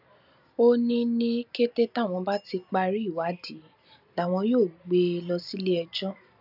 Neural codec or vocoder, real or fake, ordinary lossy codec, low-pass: vocoder, 44.1 kHz, 128 mel bands every 256 samples, BigVGAN v2; fake; none; 5.4 kHz